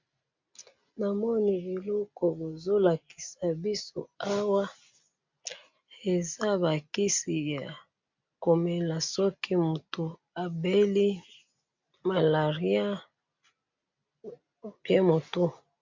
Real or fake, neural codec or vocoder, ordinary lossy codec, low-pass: real; none; MP3, 64 kbps; 7.2 kHz